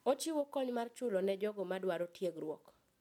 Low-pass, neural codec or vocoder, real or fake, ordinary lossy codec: 19.8 kHz; none; real; MP3, 96 kbps